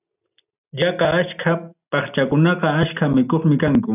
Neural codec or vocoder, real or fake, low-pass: none; real; 3.6 kHz